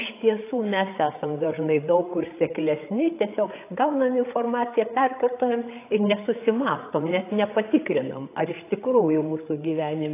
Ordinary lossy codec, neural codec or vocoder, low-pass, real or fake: AAC, 24 kbps; codec, 16 kHz, 8 kbps, FreqCodec, larger model; 3.6 kHz; fake